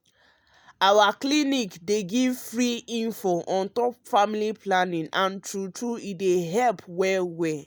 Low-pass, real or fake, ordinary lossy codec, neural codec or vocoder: none; real; none; none